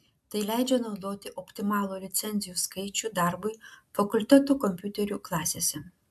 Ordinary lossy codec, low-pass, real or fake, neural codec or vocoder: AAC, 96 kbps; 14.4 kHz; real; none